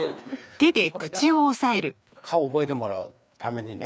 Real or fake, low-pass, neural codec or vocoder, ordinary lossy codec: fake; none; codec, 16 kHz, 2 kbps, FreqCodec, larger model; none